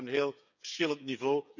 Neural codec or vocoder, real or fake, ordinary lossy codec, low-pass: codec, 16 kHz, 8 kbps, FreqCodec, smaller model; fake; none; 7.2 kHz